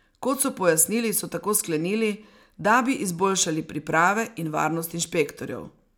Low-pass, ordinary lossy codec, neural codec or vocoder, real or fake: none; none; none; real